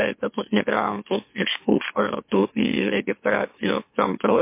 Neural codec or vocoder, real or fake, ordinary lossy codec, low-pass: autoencoder, 44.1 kHz, a latent of 192 numbers a frame, MeloTTS; fake; MP3, 24 kbps; 3.6 kHz